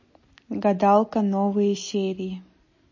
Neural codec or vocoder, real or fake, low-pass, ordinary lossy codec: none; real; 7.2 kHz; MP3, 32 kbps